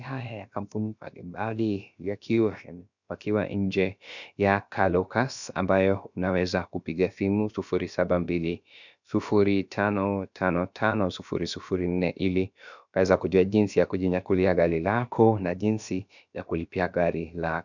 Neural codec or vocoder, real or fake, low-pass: codec, 16 kHz, about 1 kbps, DyCAST, with the encoder's durations; fake; 7.2 kHz